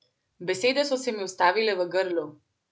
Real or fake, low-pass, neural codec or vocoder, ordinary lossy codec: real; none; none; none